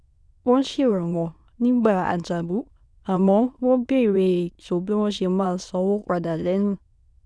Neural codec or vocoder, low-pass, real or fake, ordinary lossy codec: autoencoder, 22.05 kHz, a latent of 192 numbers a frame, VITS, trained on many speakers; none; fake; none